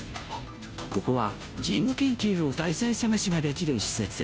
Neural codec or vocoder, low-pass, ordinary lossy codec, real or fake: codec, 16 kHz, 0.5 kbps, FunCodec, trained on Chinese and English, 25 frames a second; none; none; fake